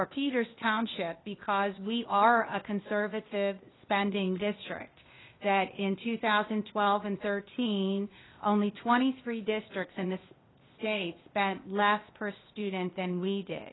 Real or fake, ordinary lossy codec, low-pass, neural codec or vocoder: fake; AAC, 16 kbps; 7.2 kHz; codec, 16 kHz, 0.8 kbps, ZipCodec